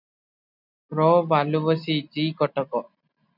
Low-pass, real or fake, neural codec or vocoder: 5.4 kHz; real; none